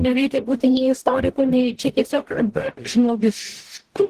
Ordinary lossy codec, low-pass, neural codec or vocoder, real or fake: Opus, 16 kbps; 14.4 kHz; codec, 44.1 kHz, 0.9 kbps, DAC; fake